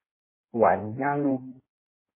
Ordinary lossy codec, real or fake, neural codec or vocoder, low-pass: MP3, 16 kbps; fake; codec, 16 kHz in and 24 kHz out, 1.1 kbps, FireRedTTS-2 codec; 3.6 kHz